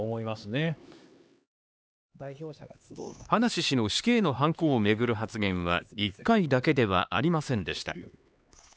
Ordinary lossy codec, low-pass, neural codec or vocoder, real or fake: none; none; codec, 16 kHz, 2 kbps, X-Codec, HuBERT features, trained on LibriSpeech; fake